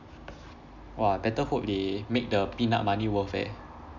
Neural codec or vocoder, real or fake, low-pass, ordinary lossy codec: none; real; 7.2 kHz; none